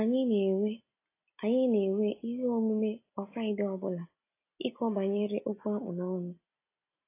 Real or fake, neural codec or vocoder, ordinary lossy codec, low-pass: real; none; AAC, 24 kbps; 3.6 kHz